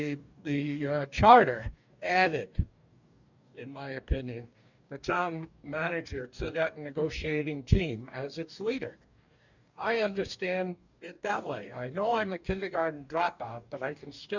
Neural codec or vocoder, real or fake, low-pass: codec, 44.1 kHz, 2.6 kbps, DAC; fake; 7.2 kHz